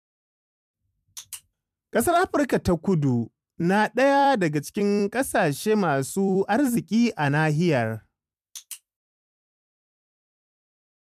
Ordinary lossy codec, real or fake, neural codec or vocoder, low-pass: MP3, 96 kbps; fake; vocoder, 44.1 kHz, 128 mel bands every 256 samples, BigVGAN v2; 14.4 kHz